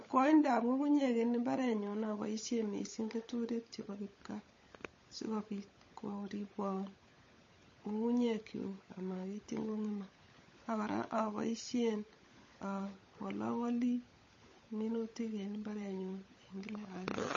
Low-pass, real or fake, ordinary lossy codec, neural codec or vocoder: 7.2 kHz; fake; MP3, 32 kbps; codec, 16 kHz, 16 kbps, FunCodec, trained on LibriTTS, 50 frames a second